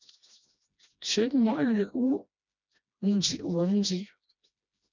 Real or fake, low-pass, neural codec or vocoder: fake; 7.2 kHz; codec, 16 kHz, 1 kbps, FreqCodec, smaller model